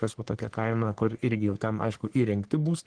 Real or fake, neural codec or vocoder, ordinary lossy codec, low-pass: fake; codec, 44.1 kHz, 3.4 kbps, Pupu-Codec; Opus, 16 kbps; 9.9 kHz